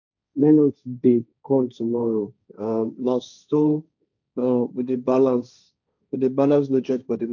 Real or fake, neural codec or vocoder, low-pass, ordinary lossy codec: fake; codec, 16 kHz, 1.1 kbps, Voila-Tokenizer; 7.2 kHz; none